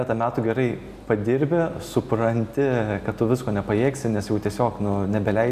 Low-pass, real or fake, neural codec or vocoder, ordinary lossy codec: 14.4 kHz; real; none; AAC, 96 kbps